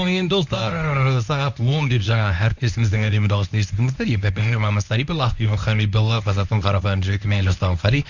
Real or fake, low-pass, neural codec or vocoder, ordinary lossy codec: fake; 7.2 kHz; codec, 24 kHz, 0.9 kbps, WavTokenizer, medium speech release version 1; none